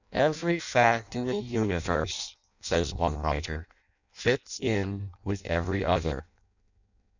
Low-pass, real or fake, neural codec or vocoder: 7.2 kHz; fake; codec, 16 kHz in and 24 kHz out, 0.6 kbps, FireRedTTS-2 codec